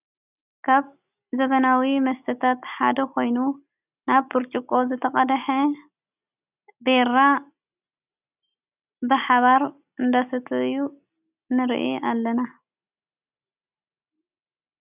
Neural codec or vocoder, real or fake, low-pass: none; real; 3.6 kHz